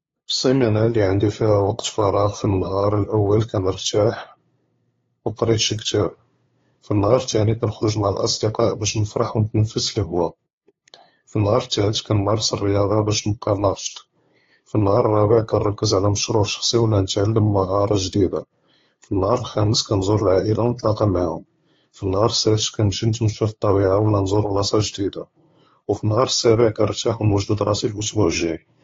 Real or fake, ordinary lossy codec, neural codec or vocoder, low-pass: fake; AAC, 32 kbps; codec, 16 kHz, 2 kbps, FunCodec, trained on LibriTTS, 25 frames a second; 7.2 kHz